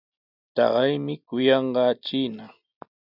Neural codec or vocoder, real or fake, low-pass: none; real; 5.4 kHz